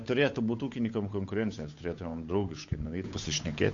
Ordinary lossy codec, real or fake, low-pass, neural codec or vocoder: MP3, 48 kbps; fake; 7.2 kHz; codec, 16 kHz, 8 kbps, FunCodec, trained on Chinese and English, 25 frames a second